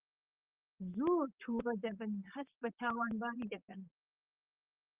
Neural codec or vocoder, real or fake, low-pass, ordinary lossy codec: vocoder, 44.1 kHz, 128 mel bands, Pupu-Vocoder; fake; 3.6 kHz; Opus, 32 kbps